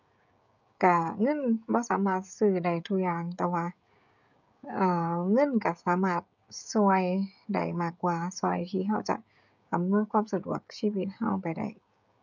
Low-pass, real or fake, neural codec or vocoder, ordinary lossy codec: 7.2 kHz; fake; codec, 16 kHz, 16 kbps, FreqCodec, smaller model; none